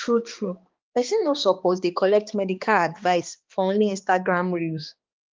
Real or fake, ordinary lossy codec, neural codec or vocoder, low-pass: fake; Opus, 32 kbps; codec, 16 kHz, 2 kbps, X-Codec, HuBERT features, trained on balanced general audio; 7.2 kHz